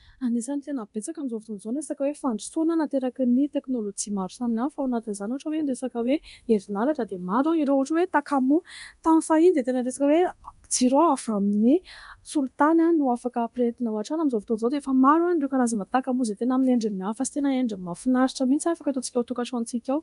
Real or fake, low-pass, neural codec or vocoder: fake; 10.8 kHz; codec, 24 kHz, 0.9 kbps, DualCodec